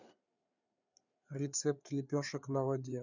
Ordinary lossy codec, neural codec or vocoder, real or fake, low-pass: none; codec, 16 kHz, 4 kbps, FreqCodec, larger model; fake; 7.2 kHz